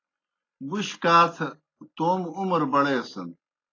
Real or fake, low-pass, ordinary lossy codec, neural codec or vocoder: real; 7.2 kHz; AAC, 32 kbps; none